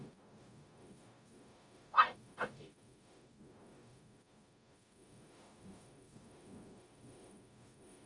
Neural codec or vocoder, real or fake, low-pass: codec, 44.1 kHz, 0.9 kbps, DAC; fake; 10.8 kHz